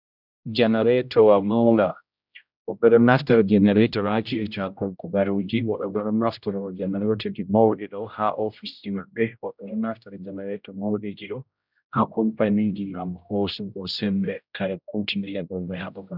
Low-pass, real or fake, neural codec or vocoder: 5.4 kHz; fake; codec, 16 kHz, 0.5 kbps, X-Codec, HuBERT features, trained on general audio